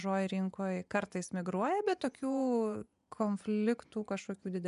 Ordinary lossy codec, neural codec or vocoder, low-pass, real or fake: MP3, 96 kbps; vocoder, 24 kHz, 100 mel bands, Vocos; 10.8 kHz; fake